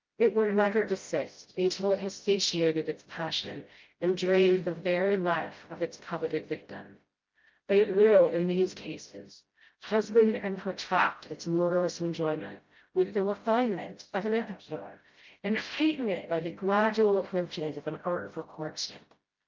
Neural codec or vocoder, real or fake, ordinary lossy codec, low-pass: codec, 16 kHz, 0.5 kbps, FreqCodec, smaller model; fake; Opus, 24 kbps; 7.2 kHz